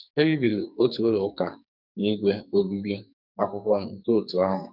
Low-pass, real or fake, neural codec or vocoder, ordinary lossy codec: 5.4 kHz; fake; codec, 44.1 kHz, 2.6 kbps, SNAC; none